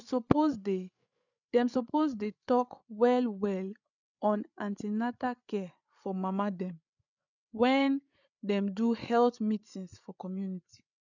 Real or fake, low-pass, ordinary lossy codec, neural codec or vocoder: fake; 7.2 kHz; none; codec, 16 kHz, 8 kbps, FreqCodec, larger model